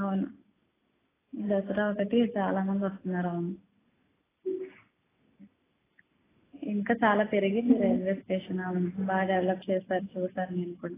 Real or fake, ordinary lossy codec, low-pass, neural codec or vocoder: real; AAC, 16 kbps; 3.6 kHz; none